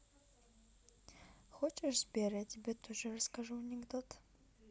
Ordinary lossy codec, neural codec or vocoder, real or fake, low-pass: none; none; real; none